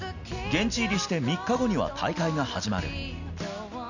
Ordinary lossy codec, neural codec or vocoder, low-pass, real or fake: none; none; 7.2 kHz; real